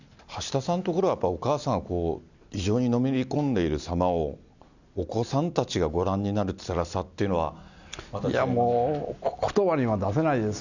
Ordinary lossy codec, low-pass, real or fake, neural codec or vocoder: none; 7.2 kHz; real; none